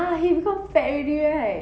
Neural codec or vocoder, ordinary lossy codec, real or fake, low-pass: none; none; real; none